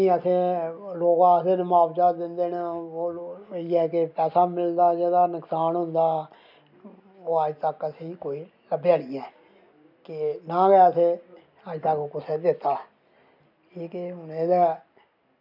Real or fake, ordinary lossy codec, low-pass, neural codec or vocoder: real; MP3, 48 kbps; 5.4 kHz; none